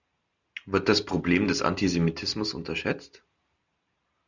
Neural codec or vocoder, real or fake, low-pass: none; real; 7.2 kHz